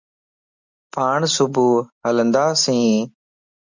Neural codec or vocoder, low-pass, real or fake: none; 7.2 kHz; real